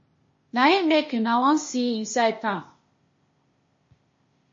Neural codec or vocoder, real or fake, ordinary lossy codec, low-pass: codec, 16 kHz, 0.8 kbps, ZipCodec; fake; MP3, 32 kbps; 7.2 kHz